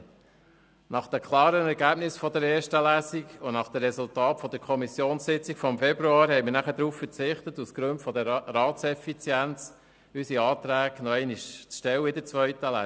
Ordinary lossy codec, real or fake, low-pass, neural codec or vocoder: none; real; none; none